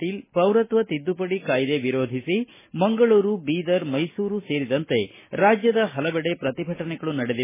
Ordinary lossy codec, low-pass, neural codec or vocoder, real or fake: MP3, 16 kbps; 3.6 kHz; none; real